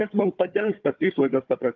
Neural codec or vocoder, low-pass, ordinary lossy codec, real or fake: codec, 16 kHz, 4 kbps, FunCodec, trained on Chinese and English, 50 frames a second; 7.2 kHz; Opus, 32 kbps; fake